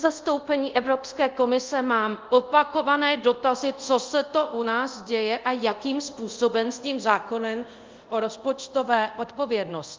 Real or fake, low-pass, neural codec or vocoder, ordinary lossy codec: fake; 7.2 kHz; codec, 24 kHz, 0.5 kbps, DualCodec; Opus, 32 kbps